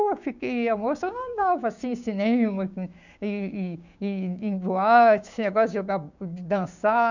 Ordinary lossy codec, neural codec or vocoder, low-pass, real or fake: none; codec, 16 kHz, 6 kbps, DAC; 7.2 kHz; fake